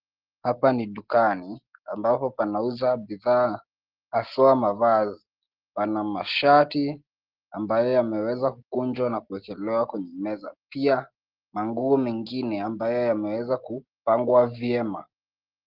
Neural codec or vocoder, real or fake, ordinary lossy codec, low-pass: none; real; Opus, 16 kbps; 5.4 kHz